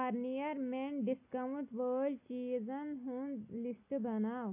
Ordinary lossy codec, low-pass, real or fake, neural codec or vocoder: AAC, 24 kbps; 3.6 kHz; real; none